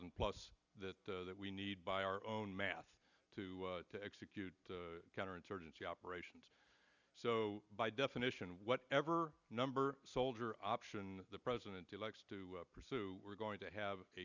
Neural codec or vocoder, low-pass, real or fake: none; 7.2 kHz; real